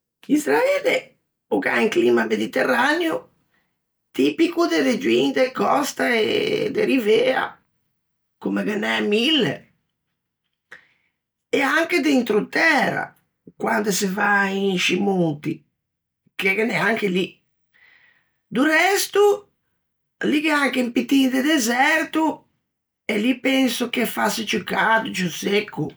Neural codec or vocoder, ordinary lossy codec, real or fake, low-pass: none; none; real; none